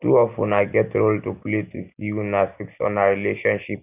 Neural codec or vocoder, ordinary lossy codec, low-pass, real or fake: none; none; 3.6 kHz; real